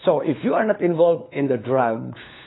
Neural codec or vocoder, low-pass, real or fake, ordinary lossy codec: codec, 16 kHz, 4 kbps, FunCodec, trained on LibriTTS, 50 frames a second; 7.2 kHz; fake; AAC, 16 kbps